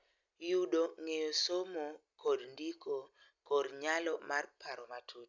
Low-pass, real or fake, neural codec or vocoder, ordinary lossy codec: 7.2 kHz; real; none; none